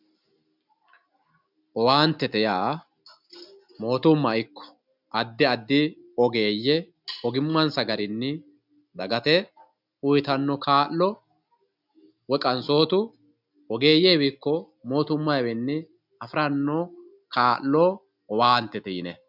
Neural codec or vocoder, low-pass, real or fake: none; 5.4 kHz; real